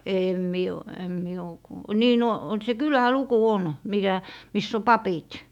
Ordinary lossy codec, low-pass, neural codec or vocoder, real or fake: none; 19.8 kHz; autoencoder, 48 kHz, 128 numbers a frame, DAC-VAE, trained on Japanese speech; fake